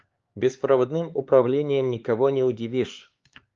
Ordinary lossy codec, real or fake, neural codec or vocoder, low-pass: Opus, 32 kbps; fake; codec, 16 kHz, 4 kbps, X-Codec, HuBERT features, trained on LibriSpeech; 7.2 kHz